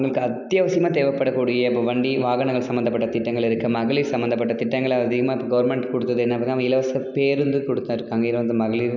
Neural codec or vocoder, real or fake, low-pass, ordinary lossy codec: none; real; 7.2 kHz; none